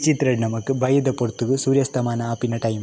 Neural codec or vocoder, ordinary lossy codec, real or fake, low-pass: none; none; real; none